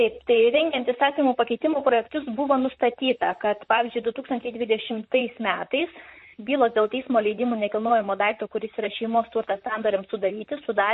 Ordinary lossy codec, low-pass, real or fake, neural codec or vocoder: MP3, 32 kbps; 10.8 kHz; fake; vocoder, 44.1 kHz, 128 mel bands, Pupu-Vocoder